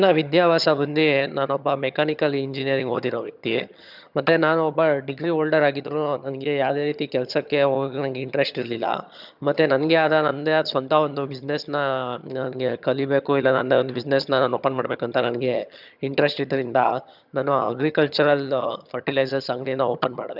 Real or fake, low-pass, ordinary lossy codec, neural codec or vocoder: fake; 5.4 kHz; none; vocoder, 22.05 kHz, 80 mel bands, HiFi-GAN